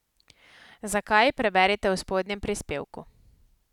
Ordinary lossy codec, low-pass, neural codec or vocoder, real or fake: none; 19.8 kHz; none; real